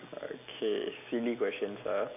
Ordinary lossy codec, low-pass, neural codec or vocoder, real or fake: none; 3.6 kHz; none; real